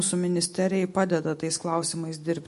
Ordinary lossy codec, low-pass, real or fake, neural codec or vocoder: MP3, 48 kbps; 14.4 kHz; real; none